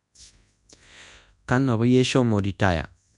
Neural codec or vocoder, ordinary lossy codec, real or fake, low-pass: codec, 24 kHz, 0.9 kbps, WavTokenizer, large speech release; none; fake; 10.8 kHz